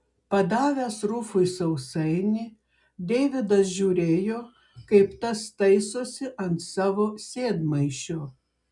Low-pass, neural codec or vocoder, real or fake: 10.8 kHz; none; real